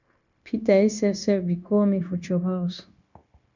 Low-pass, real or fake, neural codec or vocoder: 7.2 kHz; fake; codec, 16 kHz, 0.9 kbps, LongCat-Audio-Codec